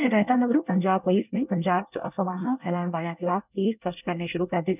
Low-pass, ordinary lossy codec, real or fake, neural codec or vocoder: 3.6 kHz; none; fake; codec, 24 kHz, 1 kbps, SNAC